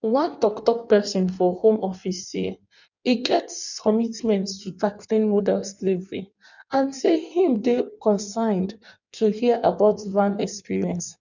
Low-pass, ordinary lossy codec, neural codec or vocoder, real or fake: 7.2 kHz; none; codec, 16 kHz in and 24 kHz out, 1.1 kbps, FireRedTTS-2 codec; fake